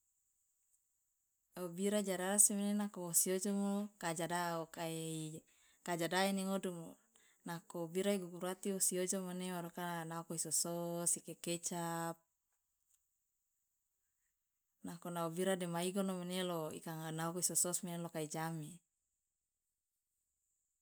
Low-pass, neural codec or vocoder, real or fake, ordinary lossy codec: none; none; real; none